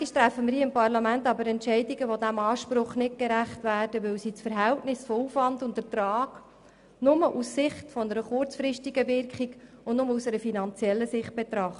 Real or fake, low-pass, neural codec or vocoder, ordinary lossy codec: real; 10.8 kHz; none; none